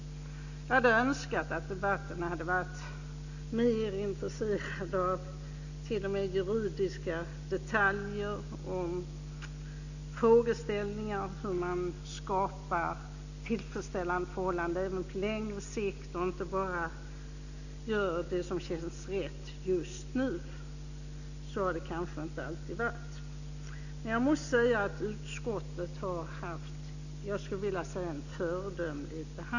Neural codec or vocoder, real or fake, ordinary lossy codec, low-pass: none; real; none; 7.2 kHz